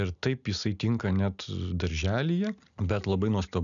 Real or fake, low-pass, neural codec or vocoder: real; 7.2 kHz; none